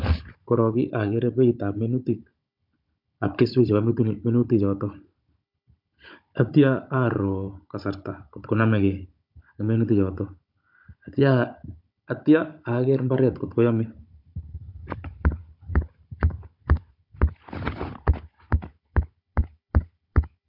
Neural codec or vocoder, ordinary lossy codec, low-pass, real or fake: codec, 16 kHz, 16 kbps, FunCodec, trained on Chinese and English, 50 frames a second; MP3, 48 kbps; 5.4 kHz; fake